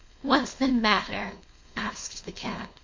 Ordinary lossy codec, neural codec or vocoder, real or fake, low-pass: MP3, 48 kbps; codec, 16 kHz, 4.8 kbps, FACodec; fake; 7.2 kHz